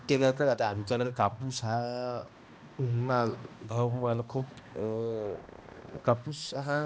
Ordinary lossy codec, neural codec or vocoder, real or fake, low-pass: none; codec, 16 kHz, 1 kbps, X-Codec, HuBERT features, trained on balanced general audio; fake; none